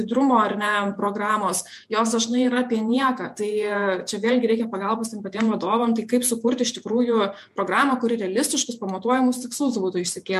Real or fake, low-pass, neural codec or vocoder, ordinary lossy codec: fake; 14.4 kHz; vocoder, 48 kHz, 128 mel bands, Vocos; MP3, 64 kbps